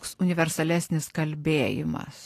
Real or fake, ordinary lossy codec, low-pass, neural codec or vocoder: real; AAC, 48 kbps; 14.4 kHz; none